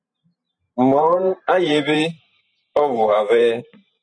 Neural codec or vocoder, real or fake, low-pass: vocoder, 44.1 kHz, 128 mel bands every 512 samples, BigVGAN v2; fake; 9.9 kHz